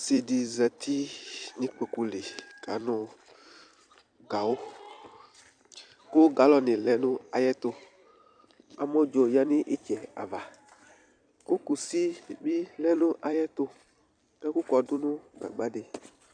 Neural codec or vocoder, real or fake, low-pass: vocoder, 44.1 kHz, 128 mel bands every 256 samples, BigVGAN v2; fake; 9.9 kHz